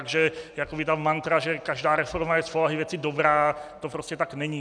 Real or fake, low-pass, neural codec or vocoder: real; 9.9 kHz; none